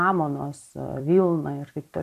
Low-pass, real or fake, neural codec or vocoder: 14.4 kHz; fake; vocoder, 44.1 kHz, 128 mel bands every 256 samples, BigVGAN v2